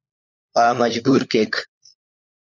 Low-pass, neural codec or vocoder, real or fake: 7.2 kHz; codec, 16 kHz, 4 kbps, FunCodec, trained on LibriTTS, 50 frames a second; fake